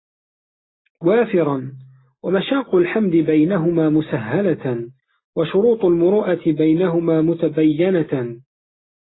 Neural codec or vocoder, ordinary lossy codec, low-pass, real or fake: none; AAC, 16 kbps; 7.2 kHz; real